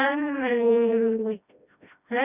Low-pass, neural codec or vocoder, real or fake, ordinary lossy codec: 3.6 kHz; codec, 16 kHz, 1 kbps, FreqCodec, smaller model; fake; none